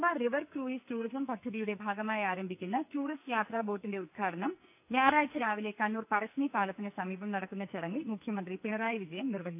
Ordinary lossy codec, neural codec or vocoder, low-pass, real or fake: AAC, 32 kbps; codec, 44.1 kHz, 2.6 kbps, SNAC; 3.6 kHz; fake